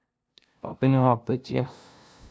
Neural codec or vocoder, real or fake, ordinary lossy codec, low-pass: codec, 16 kHz, 0.5 kbps, FunCodec, trained on LibriTTS, 25 frames a second; fake; none; none